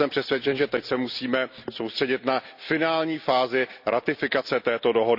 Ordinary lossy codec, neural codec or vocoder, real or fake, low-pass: none; none; real; 5.4 kHz